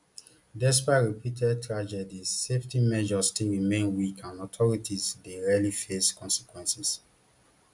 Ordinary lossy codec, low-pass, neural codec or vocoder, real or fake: none; 10.8 kHz; none; real